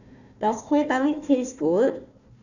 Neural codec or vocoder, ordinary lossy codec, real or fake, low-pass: codec, 16 kHz, 1 kbps, FunCodec, trained on Chinese and English, 50 frames a second; none; fake; 7.2 kHz